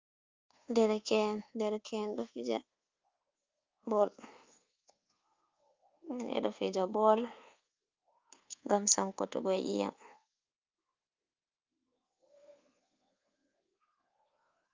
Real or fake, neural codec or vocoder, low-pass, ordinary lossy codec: fake; codec, 24 kHz, 1.2 kbps, DualCodec; 7.2 kHz; Opus, 32 kbps